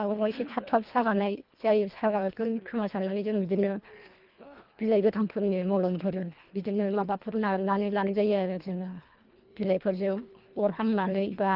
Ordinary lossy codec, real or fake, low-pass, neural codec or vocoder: Opus, 32 kbps; fake; 5.4 kHz; codec, 24 kHz, 1.5 kbps, HILCodec